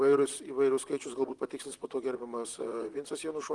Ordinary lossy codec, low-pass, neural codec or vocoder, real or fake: Opus, 24 kbps; 10.8 kHz; vocoder, 44.1 kHz, 128 mel bands, Pupu-Vocoder; fake